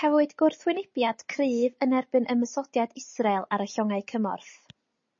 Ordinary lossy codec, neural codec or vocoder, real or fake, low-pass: MP3, 32 kbps; none; real; 7.2 kHz